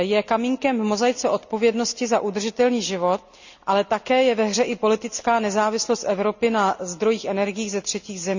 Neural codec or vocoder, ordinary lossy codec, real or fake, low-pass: none; none; real; 7.2 kHz